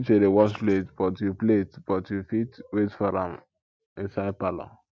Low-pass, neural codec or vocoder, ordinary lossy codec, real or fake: none; none; none; real